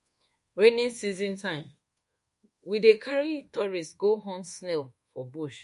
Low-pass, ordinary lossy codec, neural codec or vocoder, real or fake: 10.8 kHz; MP3, 48 kbps; codec, 24 kHz, 1.2 kbps, DualCodec; fake